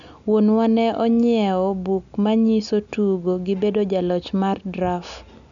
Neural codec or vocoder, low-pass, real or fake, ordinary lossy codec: none; 7.2 kHz; real; none